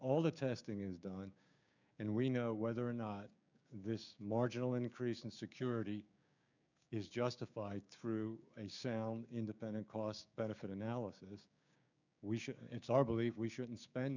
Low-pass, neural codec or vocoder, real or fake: 7.2 kHz; codec, 16 kHz, 6 kbps, DAC; fake